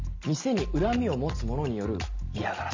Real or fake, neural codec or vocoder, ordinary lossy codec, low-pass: real; none; MP3, 64 kbps; 7.2 kHz